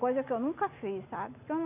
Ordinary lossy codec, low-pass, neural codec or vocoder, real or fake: none; 3.6 kHz; none; real